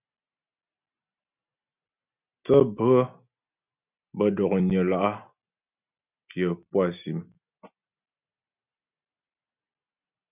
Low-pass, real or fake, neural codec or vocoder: 3.6 kHz; real; none